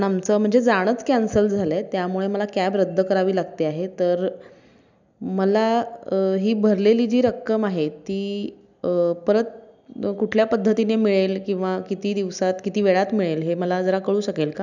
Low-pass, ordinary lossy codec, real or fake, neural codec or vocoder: 7.2 kHz; none; real; none